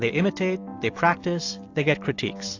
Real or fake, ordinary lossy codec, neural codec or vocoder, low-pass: real; AAC, 48 kbps; none; 7.2 kHz